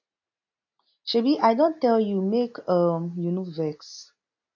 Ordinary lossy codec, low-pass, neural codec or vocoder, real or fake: AAC, 48 kbps; 7.2 kHz; none; real